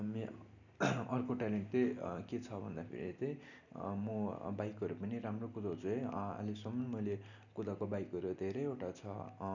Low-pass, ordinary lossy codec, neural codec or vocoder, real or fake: 7.2 kHz; none; none; real